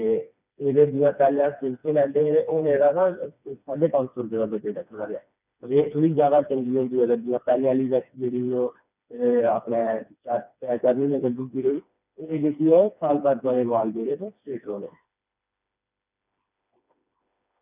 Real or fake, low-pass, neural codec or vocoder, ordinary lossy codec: fake; 3.6 kHz; codec, 16 kHz, 2 kbps, FreqCodec, smaller model; none